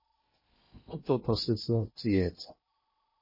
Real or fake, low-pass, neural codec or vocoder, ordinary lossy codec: fake; 5.4 kHz; codec, 16 kHz in and 24 kHz out, 0.8 kbps, FocalCodec, streaming, 65536 codes; MP3, 24 kbps